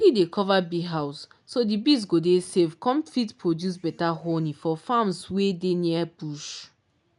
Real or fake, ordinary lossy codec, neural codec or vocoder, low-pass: real; none; none; 10.8 kHz